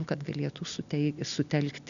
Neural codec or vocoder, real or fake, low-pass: none; real; 7.2 kHz